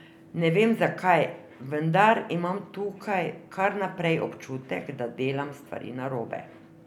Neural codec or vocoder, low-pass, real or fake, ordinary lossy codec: none; 19.8 kHz; real; none